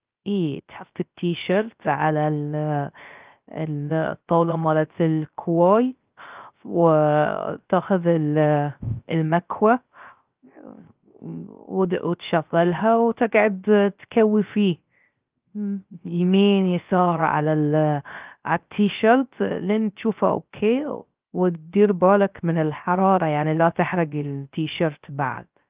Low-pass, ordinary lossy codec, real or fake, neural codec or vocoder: 3.6 kHz; Opus, 24 kbps; fake; codec, 16 kHz, 0.3 kbps, FocalCodec